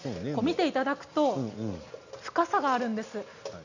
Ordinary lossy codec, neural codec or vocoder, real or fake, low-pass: none; none; real; 7.2 kHz